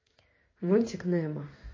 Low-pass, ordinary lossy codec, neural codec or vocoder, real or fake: 7.2 kHz; MP3, 32 kbps; codec, 16 kHz in and 24 kHz out, 1 kbps, XY-Tokenizer; fake